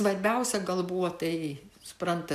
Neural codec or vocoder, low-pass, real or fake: none; 14.4 kHz; real